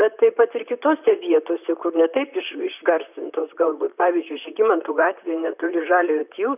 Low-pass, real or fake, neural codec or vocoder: 3.6 kHz; fake; vocoder, 44.1 kHz, 128 mel bands, Pupu-Vocoder